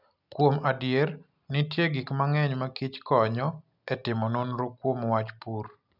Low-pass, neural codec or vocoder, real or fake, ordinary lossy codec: 5.4 kHz; none; real; none